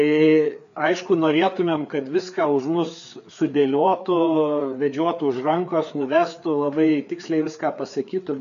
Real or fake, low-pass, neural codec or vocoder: fake; 7.2 kHz; codec, 16 kHz, 4 kbps, FreqCodec, larger model